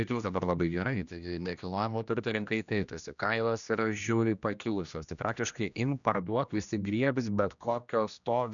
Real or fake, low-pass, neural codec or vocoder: fake; 7.2 kHz; codec, 16 kHz, 1 kbps, X-Codec, HuBERT features, trained on general audio